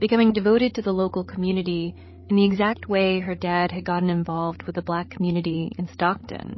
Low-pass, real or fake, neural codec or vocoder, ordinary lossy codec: 7.2 kHz; fake; codec, 16 kHz, 16 kbps, FreqCodec, larger model; MP3, 24 kbps